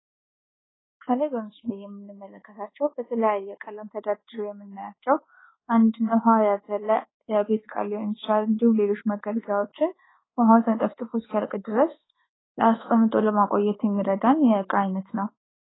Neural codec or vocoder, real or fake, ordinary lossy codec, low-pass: codec, 24 kHz, 1.2 kbps, DualCodec; fake; AAC, 16 kbps; 7.2 kHz